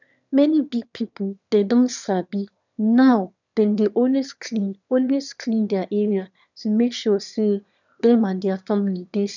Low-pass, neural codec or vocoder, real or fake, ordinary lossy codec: 7.2 kHz; autoencoder, 22.05 kHz, a latent of 192 numbers a frame, VITS, trained on one speaker; fake; none